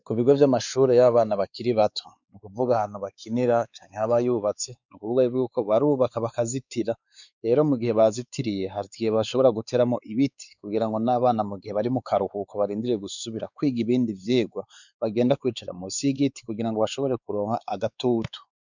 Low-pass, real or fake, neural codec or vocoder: 7.2 kHz; fake; codec, 16 kHz, 4 kbps, X-Codec, WavLM features, trained on Multilingual LibriSpeech